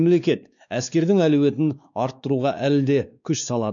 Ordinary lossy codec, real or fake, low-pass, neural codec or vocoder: none; fake; 7.2 kHz; codec, 16 kHz, 2 kbps, X-Codec, WavLM features, trained on Multilingual LibriSpeech